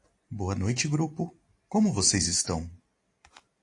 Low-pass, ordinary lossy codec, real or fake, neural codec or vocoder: 10.8 kHz; AAC, 48 kbps; real; none